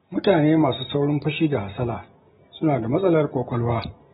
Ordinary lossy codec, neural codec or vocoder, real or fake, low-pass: AAC, 16 kbps; none; real; 19.8 kHz